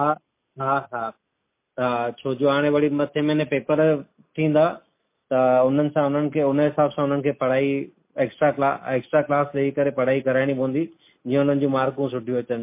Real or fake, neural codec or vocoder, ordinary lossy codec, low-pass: real; none; MP3, 24 kbps; 3.6 kHz